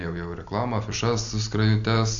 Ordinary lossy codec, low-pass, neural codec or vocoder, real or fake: AAC, 64 kbps; 7.2 kHz; none; real